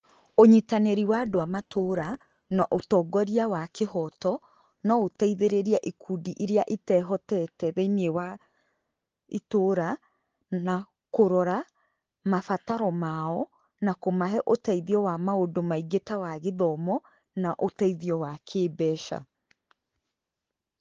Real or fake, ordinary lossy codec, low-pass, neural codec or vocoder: real; Opus, 16 kbps; 7.2 kHz; none